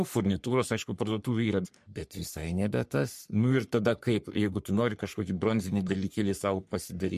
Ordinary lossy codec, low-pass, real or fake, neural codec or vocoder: MP3, 64 kbps; 14.4 kHz; fake; codec, 44.1 kHz, 3.4 kbps, Pupu-Codec